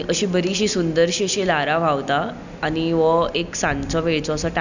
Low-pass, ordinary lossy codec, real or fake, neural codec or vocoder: 7.2 kHz; none; real; none